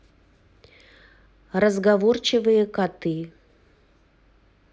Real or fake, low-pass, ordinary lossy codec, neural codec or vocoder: real; none; none; none